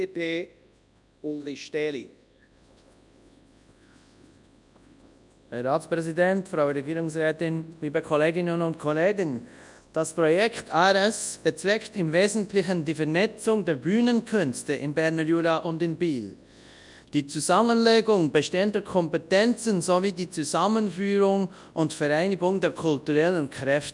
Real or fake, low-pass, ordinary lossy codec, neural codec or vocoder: fake; 10.8 kHz; none; codec, 24 kHz, 0.9 kbps, WavTokenizer, large speech release